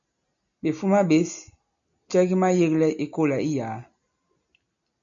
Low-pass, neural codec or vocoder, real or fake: 7.2 kHz; none; real